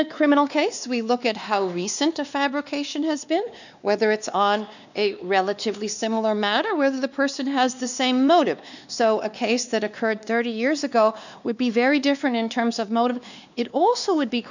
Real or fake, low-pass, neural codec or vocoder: fake; 7.2 kHz; codec, 16 kHz, 2 kbps, X-Codec, WavLM features, trained on Multilingual LibriSpeech